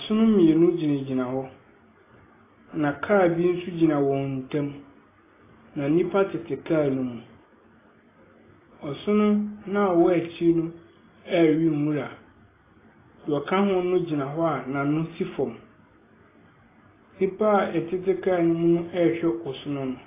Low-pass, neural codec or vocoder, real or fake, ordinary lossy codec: 3.6 kHz; none; real; AAC, 16 kbps